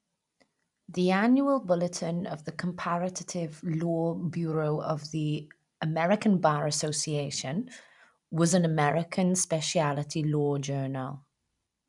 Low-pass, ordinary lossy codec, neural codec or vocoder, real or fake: 10.8 kHz; none; none; real